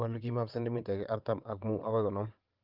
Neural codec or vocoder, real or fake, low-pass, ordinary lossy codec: vocoder, 22.05 kHz, 80 mel bands, WaveNeXt; fake; 5.4 kHz; Opus, 24 kbps